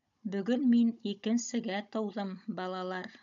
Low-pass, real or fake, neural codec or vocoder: 7.2 kHz; fake; codec, 16 kHz, 16 kbps, FunCodec, trained on Chinese and English, 50 frames a second